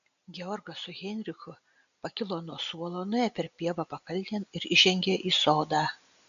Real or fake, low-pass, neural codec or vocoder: real; 7.2 kHz; none